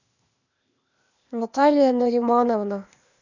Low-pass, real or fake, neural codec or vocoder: 7.2 kHz; fake; codec, 16 kHz, 0.8 kbps, ZipCodec